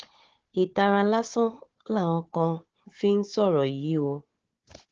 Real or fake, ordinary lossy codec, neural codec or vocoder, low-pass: fake; Opus, 16 kbps; codec, 16 kHz, 4 kbps, FunCodec, trained on Chinese and English, 50 frames a second; 7.2 kHz